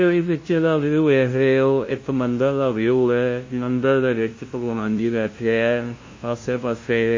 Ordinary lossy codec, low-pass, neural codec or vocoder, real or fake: MP3, 32 kbps; 7.2 kHz; codec, 16 kHz, 0.5 kbps, FunCodec, trained on LibriTTS, 25 frames a second; fake